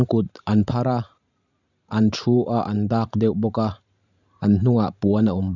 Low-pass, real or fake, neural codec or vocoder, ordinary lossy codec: 7.2 kHz; fake; vocoder, 44.1 kHz, 128 mel bands every 512 samples, BigVGAN v2; none